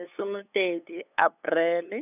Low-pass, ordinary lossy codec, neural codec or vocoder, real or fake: 3.6 kHz; none; codec, 16 kHz, 8 kbps, FunCodec, trained on LibriTTS, 25 frames a second; fake